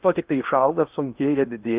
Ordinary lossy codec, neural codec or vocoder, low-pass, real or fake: Opus, 32 kbps; codec, 16 kHz in and 24 kHz out, 0.8 kbps, FocalCodec, streaming, 65536 codes; 3.6 kHz; fake